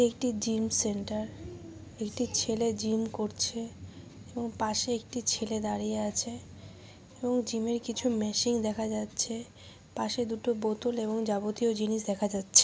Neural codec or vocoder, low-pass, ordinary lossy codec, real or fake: none; none; none; real